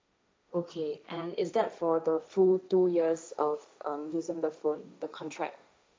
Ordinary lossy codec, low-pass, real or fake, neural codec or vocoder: none; none; fake; codec, 16 kHz, 1.1 kbps, Voila-Tokenizer